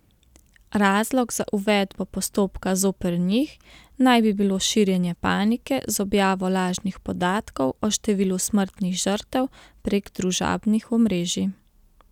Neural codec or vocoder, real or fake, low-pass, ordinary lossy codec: none; real; 19.8 kHz; none